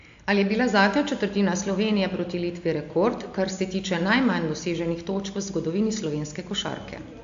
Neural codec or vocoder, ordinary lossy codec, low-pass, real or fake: none; AAC, 64 kbps; 7.2 kHz; real